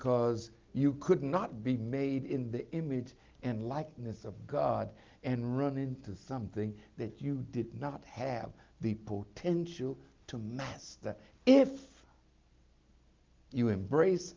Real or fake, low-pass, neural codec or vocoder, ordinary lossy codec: real; 7.2 kHz; none; Opus, 24 kbps